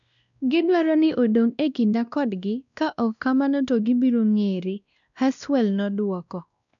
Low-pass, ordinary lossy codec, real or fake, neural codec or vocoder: 7.2 kHz; none; fake; codec, 16 kHz, 1 kbps, X-Codec, WavLM features, trained on Multilingual LibriSpeech